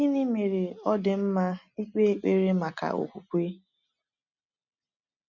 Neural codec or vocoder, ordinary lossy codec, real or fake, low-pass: none; none; real; 7.2 kHz